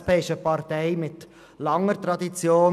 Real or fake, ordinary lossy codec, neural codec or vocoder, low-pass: real; none; none; 14.4 kHz